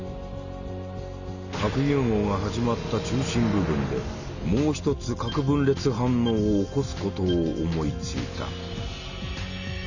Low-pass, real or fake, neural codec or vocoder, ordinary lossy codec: 7.2 kHz; real; none; none